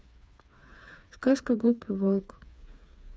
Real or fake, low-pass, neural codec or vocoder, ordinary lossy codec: fake; none; codec, 16 kHz, 4 kbps, FreqCodec, smaller model; none